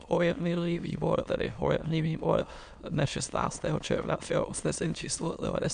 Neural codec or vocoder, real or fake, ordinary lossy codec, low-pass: autoencoder, 22.05 kHz, a latent of 192 numbers a frame, VITS, trained on many speakers; fake; MP3, 96 kbps; 9.9 kHz